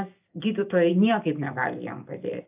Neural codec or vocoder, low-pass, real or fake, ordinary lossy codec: none; 3.6 kHz; real; AAC, 32 kbps